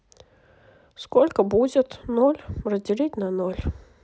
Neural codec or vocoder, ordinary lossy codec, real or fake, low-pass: none; none; real; none